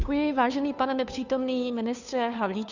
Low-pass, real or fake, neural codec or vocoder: 7.2 kHz; fake; codec, 16 kHz, 2 kbps, FunCodec, trained on Chinese and English, 25 frames a second